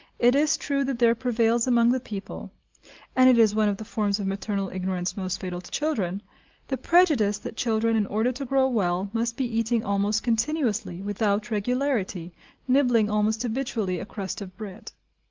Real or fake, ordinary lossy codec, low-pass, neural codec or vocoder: real; Opus, 24 kbps; 7.2 kHz; none